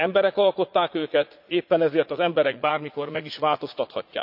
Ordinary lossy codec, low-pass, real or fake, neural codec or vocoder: none; 5.4 kHz; fake; vocoder, 44.1 kHz, 80 mel bands, Vocos